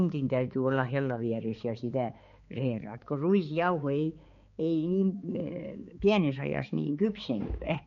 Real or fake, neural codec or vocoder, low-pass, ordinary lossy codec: fake; codec, 16 kHz, 4 kbps, X-Codec, HuBERT features, trained on balanced general audio; 7.2 kHz; MP3, 48 kbps